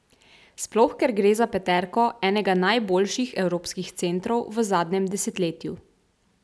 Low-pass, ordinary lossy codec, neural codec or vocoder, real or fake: none; none; none; real